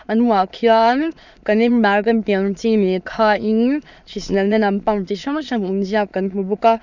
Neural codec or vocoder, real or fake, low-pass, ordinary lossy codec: autoencoder, 22.05 kHz, a latent of 192 numbers a frame, VITS, trained on many speakers; fake; 7.2 kHz; none